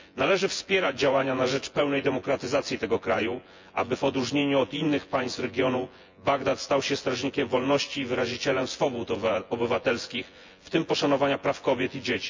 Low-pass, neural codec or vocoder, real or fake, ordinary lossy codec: 7.2 kHz; vocoder, 24 kHz, 100 mel bands, Vocos; fake; MP3, 64 kbps